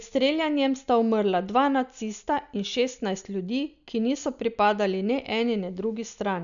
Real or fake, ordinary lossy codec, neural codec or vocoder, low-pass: real; none; none; 7.2 kHz